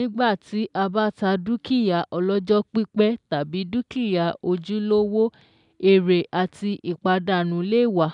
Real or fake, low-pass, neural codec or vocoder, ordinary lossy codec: real; 10.8 kHz; none; none